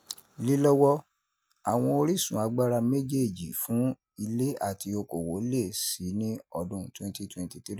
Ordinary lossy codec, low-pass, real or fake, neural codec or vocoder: none; none; real; none